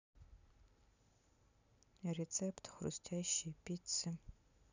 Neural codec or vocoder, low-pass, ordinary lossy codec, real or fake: none; 7.2 kHz; none; real